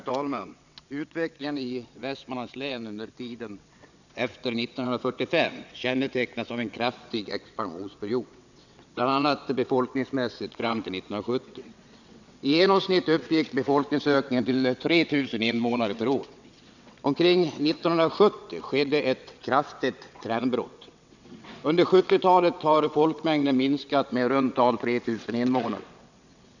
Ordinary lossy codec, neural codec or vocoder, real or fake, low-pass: none; vocoder, 22.05 kHz, 80 mel bands, WaveNeXt; fake; 7.2 kHz